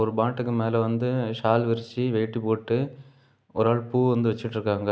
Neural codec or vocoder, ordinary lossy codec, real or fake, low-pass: none; none; real; none